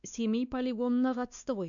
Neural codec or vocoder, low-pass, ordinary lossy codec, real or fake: codec, 16 kHz, 2 kbps, X-Codec, WavLM features, trained on Multilingual LibriSpeech; 7.2 kHz; none; fake